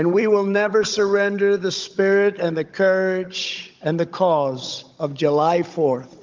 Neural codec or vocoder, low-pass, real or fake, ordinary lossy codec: codec, 16 kHz, 16 kbps, FunCodec, trained on Chinese and English, 50 frames a second; 7.2 kHz; fake; Opus, 24 kbps